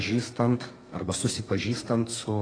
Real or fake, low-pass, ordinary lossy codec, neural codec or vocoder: fake; 9.9 kHz; AAC, 32 kbps; codec, 32 kHz, 1.9 kbps, SNAC